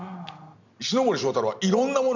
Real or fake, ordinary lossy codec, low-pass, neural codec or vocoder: real; none; 7.2 kHz; none